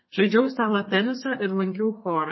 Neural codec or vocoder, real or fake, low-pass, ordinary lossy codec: codec, 32 kHz, 1.9 kbps, SNAC; fake; 7.2 kHz; MP3, 24 kbps